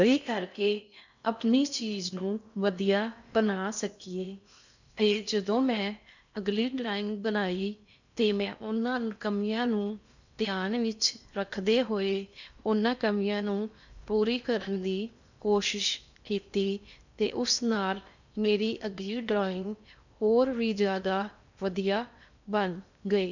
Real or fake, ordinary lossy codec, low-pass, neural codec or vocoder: fake; none; 7.2 kHz; codec, 16 kHz in and 24 kHz out, 0.6 kbps, FocalCodec, streaming, 4096 codes